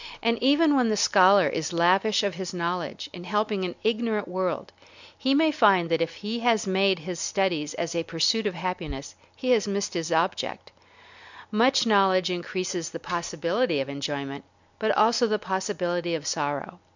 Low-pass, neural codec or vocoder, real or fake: 7.2 kHz; none; real